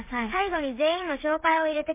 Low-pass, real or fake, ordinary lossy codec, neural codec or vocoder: 3.6 kHz; fake; MP3, 24 kbps; codec, 16 kHz, 8 kbps, FreqCodec, smaller model